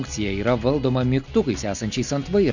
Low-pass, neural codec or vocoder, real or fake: 7.2 kHz; none; real